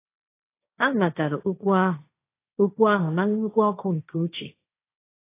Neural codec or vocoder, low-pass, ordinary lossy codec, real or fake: codec, 16 kHz, 1.1 kbps, Voila-Tokenizer; 3.6 kHz; AAC, 24 kbps; fake